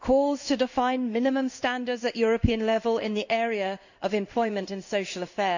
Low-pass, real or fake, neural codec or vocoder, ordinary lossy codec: 7.2 kHz; fake; codec, 16 kHz in and 24 kHz out, 1 kbps, XY-Tokenizer; none